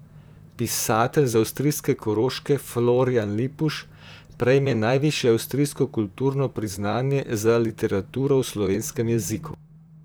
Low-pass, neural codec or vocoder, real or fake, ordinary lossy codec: none; vocoder, 44.1 kHz, 128 mel bands, Pupu-Vocoder; fake; none